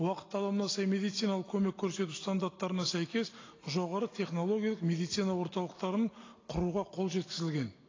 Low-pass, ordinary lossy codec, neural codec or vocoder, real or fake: 7.2 kHz; AAC, 32 kbps; none; real